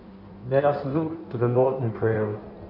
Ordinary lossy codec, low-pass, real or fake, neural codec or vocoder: none; 5.4 kHz; fake; codec, 16 kHz in and 24 kHz out, 1.1 kbps, FireRedTTS-2 codec